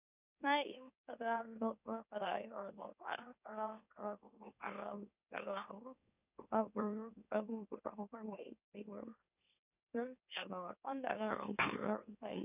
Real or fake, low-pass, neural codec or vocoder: fake; 3.6 kHz; autoencoder, 44.1 kHz, a latent of 192 numbers a frame, MeloTTS